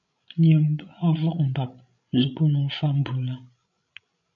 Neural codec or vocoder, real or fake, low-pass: codec, 16 kHz, 16 kbps, FreqCodec, larger model; fake; 7.2 kHz